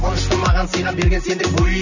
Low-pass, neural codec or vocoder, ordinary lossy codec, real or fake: 7.2 kHz; none; none; real